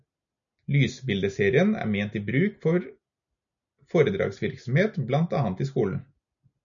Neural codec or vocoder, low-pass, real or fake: none; 7.2 kHz; real